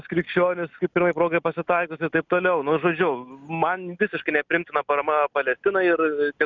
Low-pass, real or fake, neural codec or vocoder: 7.2 kHz; real; none